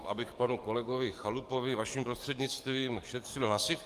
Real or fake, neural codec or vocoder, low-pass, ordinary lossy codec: fake; codec, 44.1 kHz, 7.8 kbps, DAC; 14.4 kHz; Opus, 32 kbps